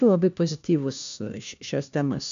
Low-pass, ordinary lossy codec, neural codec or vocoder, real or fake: 7.2 kHz; AAC, 64 kbps; codec, 16 kHz, 1 kbps, FunCodec, trained on LibriTTS, 50 frames a second; fake